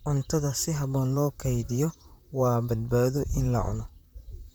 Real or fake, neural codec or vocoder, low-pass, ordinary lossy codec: fake; vocoder, 44.1 kHz, 128 mel bands, Pupu-Vocoder; none; none